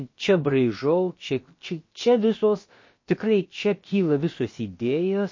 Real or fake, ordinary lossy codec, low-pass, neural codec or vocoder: fake; MP3, 32 kbps; 7.2 kHz; codec, 16 kHz, about 1 kbps, DyCAST, with the encoder's durations